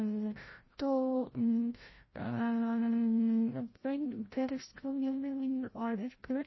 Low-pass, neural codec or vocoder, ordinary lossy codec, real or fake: 7.2 kHz; codec, 16 kHz, 0.5 kbps, FreqCodec, larger model; MP3, 24 kbps; fake